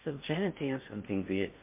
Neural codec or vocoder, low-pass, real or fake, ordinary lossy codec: codec, 16 kHz in and 24 kHz out, 0.6 kbps, FocalCodec, streaming, 2048 codes; 3.6 kHz; fake; MP3, 32 kbps